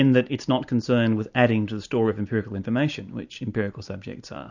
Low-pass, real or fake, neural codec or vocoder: 7.2 kHz; real; none